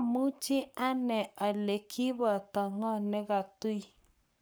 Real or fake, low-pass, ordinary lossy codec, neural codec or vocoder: fake; none; none; codec, 44.1 kHz, 7.8 kbps, Pupu-Codec